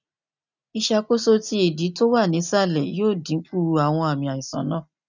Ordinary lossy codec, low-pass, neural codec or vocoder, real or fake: none; 7.2 kHz; none; real